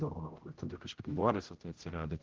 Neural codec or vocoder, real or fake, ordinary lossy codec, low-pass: codec, 16 kHz, 0.5 kbps, X-Codec, HuBERT features, trained on general audio; fake; Opus, 16 kbps; 7.2 kHz